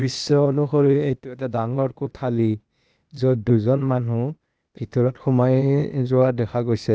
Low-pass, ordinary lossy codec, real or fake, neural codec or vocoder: none; none; fake; codec, 16 kHz, 0.8 kbps, ZipCodec